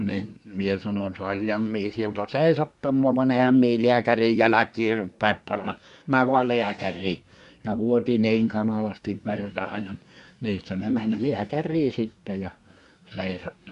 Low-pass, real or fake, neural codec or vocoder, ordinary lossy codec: 10.8 kHz; fake; codec, 24 kHz, 1 kbps, SNAC; none